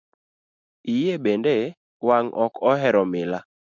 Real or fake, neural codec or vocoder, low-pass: real; none; 7.2 kHz